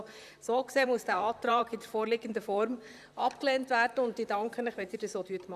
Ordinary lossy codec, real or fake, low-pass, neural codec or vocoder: none; fake; 14.4 kHz; vocoder, 44.1 kHz, 128 mel bands, Pupu-Vocoder